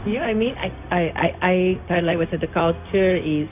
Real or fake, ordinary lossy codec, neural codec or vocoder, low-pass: fake; none; codec, 16 kHz, 0.4 kbps, LongCat-Audio-Codec; 3.6 kHz